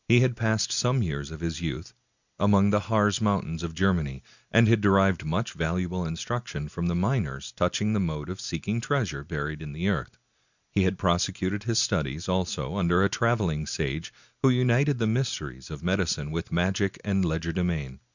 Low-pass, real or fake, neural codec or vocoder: 7.2 kHz; real; none